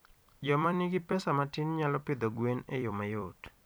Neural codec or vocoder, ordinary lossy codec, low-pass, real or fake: vocoder, 44.1 kHz, 128 mel bands every 256 samples, BigVGAN v2; none; none; fake